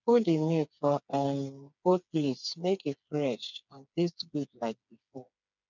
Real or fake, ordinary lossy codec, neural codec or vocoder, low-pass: fake; none; codec, 16 kHz, 4 kbps, FreqCodec, smaller model; 7.2 kHz